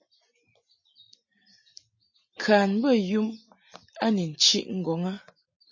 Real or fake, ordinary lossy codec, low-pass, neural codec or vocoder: real; MP3, 32 kbps; 7.2 kHz; none